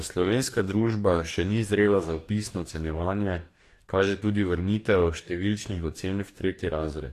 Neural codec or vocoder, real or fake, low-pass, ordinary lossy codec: codec, 44.1 kHz, 2.6 kbps, DAC; fake; 14.4 kHz; AAC, 64 kbps